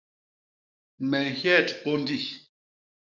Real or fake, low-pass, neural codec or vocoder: fake; 7.2 kHz; codec, 44.1 kHz, 7.8 kbps, DAC